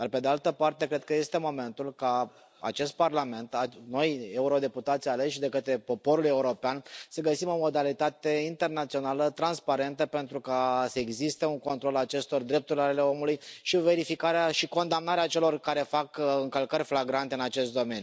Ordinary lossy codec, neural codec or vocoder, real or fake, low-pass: none; none; real; none